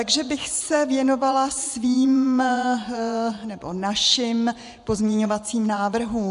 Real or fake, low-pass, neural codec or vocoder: fake; 10.8 kHz; vocoder, 24 kHz, 100 mel bands, Vocos